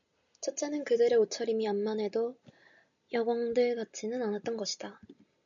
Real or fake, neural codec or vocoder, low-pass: real; none; 7.2 kHz